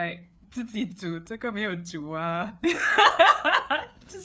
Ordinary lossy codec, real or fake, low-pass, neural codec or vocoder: none; fake; none; codec, 16 kHz, 4 kbps, FreqCodec, larger model